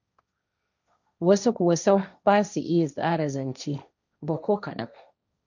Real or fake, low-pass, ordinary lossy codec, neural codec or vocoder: fake; 7.2 kHz; none; codec, 16 kHz, 1.1 kbps, Voila-Tokenizer